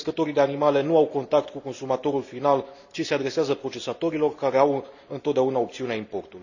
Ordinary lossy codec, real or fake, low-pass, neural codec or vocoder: none; real; 7.2 kHz; none